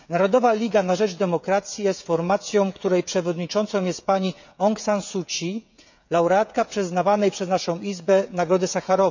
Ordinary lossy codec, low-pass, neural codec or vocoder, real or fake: none; 7.2 kHz; codec, 16 kHz, 16 kbps, FreqCodec, smaller model; fake